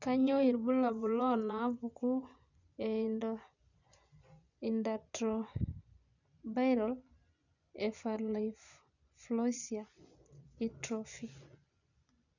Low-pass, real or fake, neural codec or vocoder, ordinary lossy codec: 7.2 kHz; fake; vocoder, 24 kHz, 100 mel bands, Vocos; none